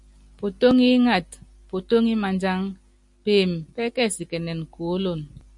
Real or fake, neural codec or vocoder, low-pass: real; none; 10.8 kHz